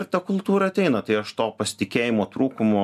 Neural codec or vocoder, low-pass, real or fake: none; 14.4 kHz; real